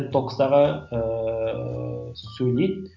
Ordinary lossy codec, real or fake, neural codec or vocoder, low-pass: none; real; none; 7.2 kHz